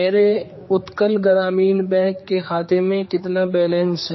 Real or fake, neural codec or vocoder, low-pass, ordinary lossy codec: fake; codec, 16 kHz, 4 kbps, X-Codec, HuBERT features, trained on general audio; 7.2 kHz; MP3, 24 kbps